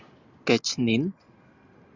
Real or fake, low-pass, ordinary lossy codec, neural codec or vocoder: real; 7.2 kHz; Opus, 64 kbps; none